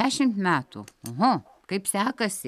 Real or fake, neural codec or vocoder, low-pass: real; none; 14.4 kHz